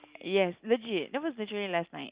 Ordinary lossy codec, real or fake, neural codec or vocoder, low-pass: Opus, 64 kbps; real; none; 3.6 kHz